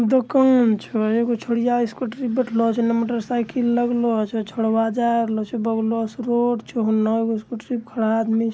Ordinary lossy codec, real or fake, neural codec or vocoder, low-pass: none; real; none; none